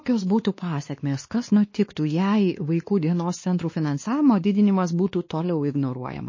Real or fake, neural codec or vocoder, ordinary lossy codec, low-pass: fake; codec, 16 kHz, 2 kbps, X-Codec, WavLM features, trained on Multilingual LibriSpeech; MP3, 32 kbps; 7.2 kHz